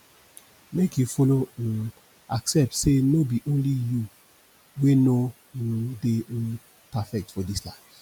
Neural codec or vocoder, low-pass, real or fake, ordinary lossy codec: none; 19.8 kHz; real; none